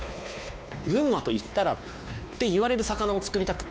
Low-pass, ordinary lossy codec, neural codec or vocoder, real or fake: none; none; codec, 16 kHz, 2 kbps, X-Codec, WavLM features, trained on Multilingual LibriSpeech; fake